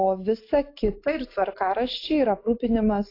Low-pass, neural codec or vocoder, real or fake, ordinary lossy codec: 5.4 kHz; none; real; AAC, 32 kbps